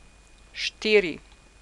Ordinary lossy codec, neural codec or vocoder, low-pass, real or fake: none; none; 10.8 kHz; real